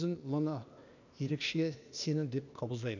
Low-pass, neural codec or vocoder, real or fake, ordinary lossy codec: 7.2 kHz; codec, 16 kHz, 0.8 kbps, ZipCodec; fake; none